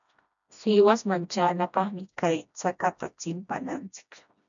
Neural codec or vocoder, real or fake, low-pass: codec, 16 kHz, 1 kbps, FreqCodec, smaller model; fake; 7.2 kHz